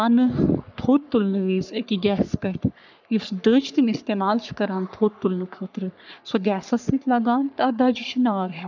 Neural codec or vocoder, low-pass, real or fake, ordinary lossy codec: codec, 44.1 kHz, 3.4 kbps, Pupu-Codec; 7.2 kHz; fake; none